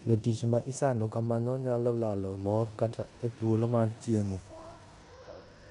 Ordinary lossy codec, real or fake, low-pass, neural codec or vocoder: none; fake; 10.8 kHz; codec, 16 kHz in and 24 kHz out, 0.9 kbps, LongCat-Audio-Codec, four codebook decoder